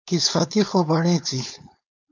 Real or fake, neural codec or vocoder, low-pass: fake; codec, 16 kHz, 4.8 kbps, FACodec; 7.2 kHz